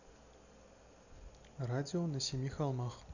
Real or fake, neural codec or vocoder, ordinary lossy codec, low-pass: real; none; none; 7.2 kHz